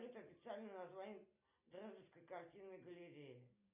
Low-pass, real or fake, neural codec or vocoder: 3.6 kHz; real; none